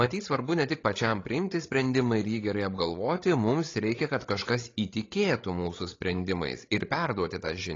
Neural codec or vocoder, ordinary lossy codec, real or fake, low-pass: codec, 16 kHz, 16 kbps, FreqCodec, larger model; AAC, 32 kbps; fake; 7.2 kHz